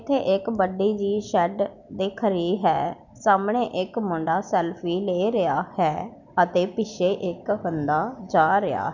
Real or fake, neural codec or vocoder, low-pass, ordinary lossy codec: real; none; 7.2 kHz; none